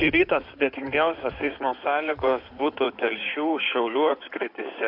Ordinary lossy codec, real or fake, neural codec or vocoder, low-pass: AAC, 48 kbps; fake; codec, 16 kHz in and 24 kHz out, 2.2 kbps, FireRedTTS-2 codec; 5.4 kHz